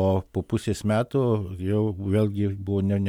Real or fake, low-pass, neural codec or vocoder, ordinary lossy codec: real; 19.8 kHz; none; MP3, 96 kbps